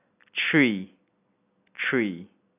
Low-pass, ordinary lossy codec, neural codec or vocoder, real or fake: 3.6 kHz; none; none; real